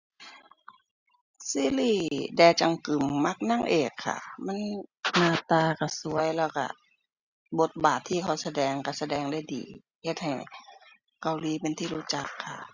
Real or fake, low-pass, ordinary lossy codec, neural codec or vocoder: real; 7.2 kHz; Opus, 64 kbps; none